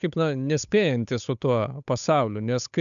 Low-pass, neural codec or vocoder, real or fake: 7.2 kHz; codec, 16 kHz, 8 kbps, FunCodec, trained on Chinese and English, 25 frames a second; fake